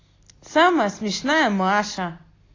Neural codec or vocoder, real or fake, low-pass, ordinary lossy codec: none; real; 7.2 kHz; AAC, 32 kbps